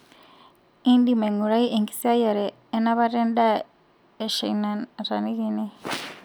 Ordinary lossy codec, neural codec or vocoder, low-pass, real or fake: none; none; none; real